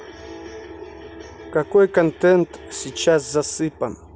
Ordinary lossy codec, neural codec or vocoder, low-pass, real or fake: none; none; none; real